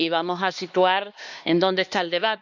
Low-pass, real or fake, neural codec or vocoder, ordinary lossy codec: 7.2 kHz; fake; codec, 16 kHz, 2 kbps, X-Codec, HuBERT features, trained on LibriSpeech; none